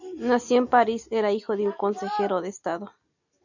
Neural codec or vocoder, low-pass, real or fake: none; 7.2 kHz; real